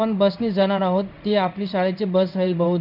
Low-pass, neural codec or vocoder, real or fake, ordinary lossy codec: 5.4 kHz; codec, 16 kHz in and 24 kHz out, 1 kbps, XY-Tokenizer; fake; none